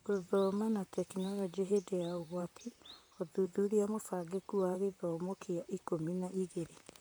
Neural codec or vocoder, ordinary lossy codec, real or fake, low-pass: vocoder, 44.1 kHz, 128 mel bands, Pupu-Vocoder; none; fake; none